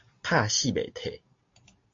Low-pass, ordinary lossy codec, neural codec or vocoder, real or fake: 7.2 kHz; AAC, 48 kbps; none; real